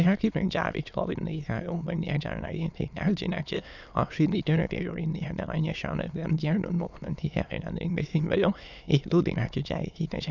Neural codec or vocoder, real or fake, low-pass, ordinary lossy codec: autoencoder, 22.05 kHz, a latent of 192 numbers a frame, VITS, trained on many speakers; fake; 7.2 kHz; none